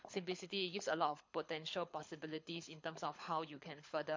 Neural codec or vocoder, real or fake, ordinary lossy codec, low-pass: codec, 24 kHz, 6 kbps, HILCodec; fake; MP3, 48 kbps; 7.2 kHz